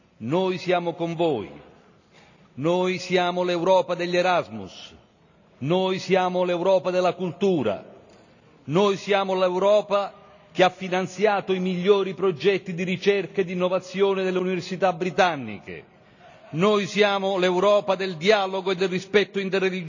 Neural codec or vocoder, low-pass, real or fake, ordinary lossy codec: none; 7.2 kHz; real; none